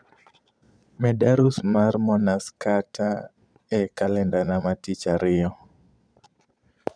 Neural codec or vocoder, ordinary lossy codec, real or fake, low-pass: vocoder, 22.05 kHz, 80 mel bands, Vocos; none; fake; 9.9 kHz